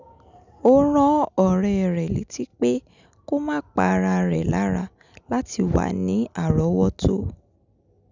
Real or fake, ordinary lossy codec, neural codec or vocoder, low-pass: real; none; none; 7.2 kHz